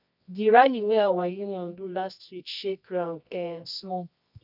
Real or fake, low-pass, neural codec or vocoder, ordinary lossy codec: fake; 5.4 kHz; codec, 24 kHz, 0.9 kbps, WavTokenizer, medium music audio release; none